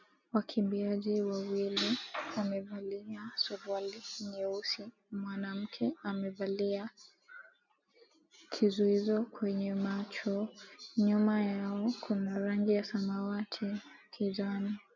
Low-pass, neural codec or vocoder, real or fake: 7.2 kHz; none; real